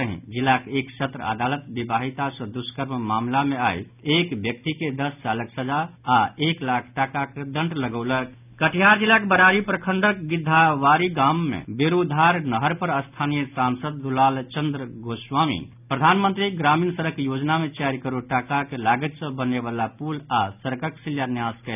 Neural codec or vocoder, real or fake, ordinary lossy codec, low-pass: none; real; none; 3.6 kHz